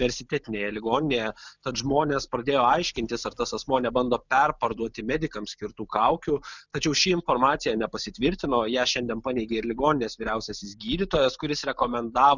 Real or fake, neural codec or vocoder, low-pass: real; none; 7.2 kHz